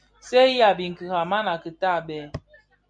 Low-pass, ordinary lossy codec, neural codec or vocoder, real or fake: 9.9 kHz; MP3, 64 kbps; none; real